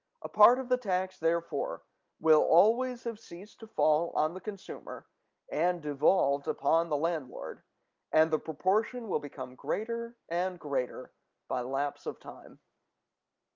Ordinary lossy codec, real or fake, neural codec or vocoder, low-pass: Opus, 24 kbps; real; none; 7.2 kHz